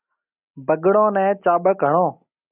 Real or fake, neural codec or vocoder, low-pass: real; none; 3.6 kHz